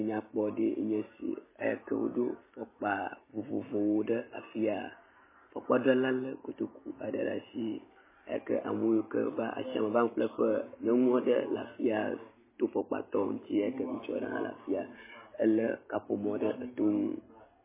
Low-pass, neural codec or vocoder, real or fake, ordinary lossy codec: 3.6 kHz; none; real; MP3, 16 kbps